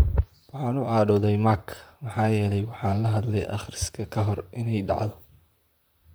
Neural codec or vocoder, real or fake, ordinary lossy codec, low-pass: vocoder, 44.1 kHz, 128 mel bands, Pupu-Vocoder; fake; none; none